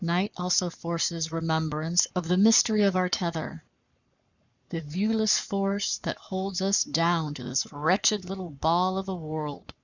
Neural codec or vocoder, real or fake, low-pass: codec, 44.1 kHz, 7.8 kbps, Pupu-Codec; fake; 7.2 kHz